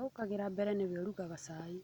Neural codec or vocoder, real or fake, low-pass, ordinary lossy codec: none; real; 19.8 kHz; none